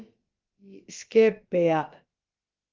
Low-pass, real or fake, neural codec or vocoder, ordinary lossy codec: 7.2 kHz; fake; codec, 16 kHz, about 1 kbps, DyCAST, with the encoder's durations; Opus, 32 kbps